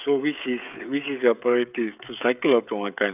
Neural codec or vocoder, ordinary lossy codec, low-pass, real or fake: codec, 16 kHz, 16 kbps, FreqCodec, smaller model; none; 3.6 kHz; fake